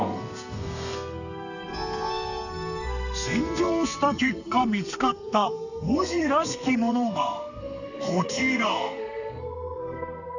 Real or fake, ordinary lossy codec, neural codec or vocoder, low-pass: fake; none; codec, 32 kHz, 1.9 kbps, SNAC; 7.2 kHz